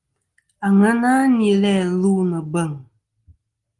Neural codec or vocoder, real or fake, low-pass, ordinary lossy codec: none; real; 10.8 kHz; Opus, 24 kbps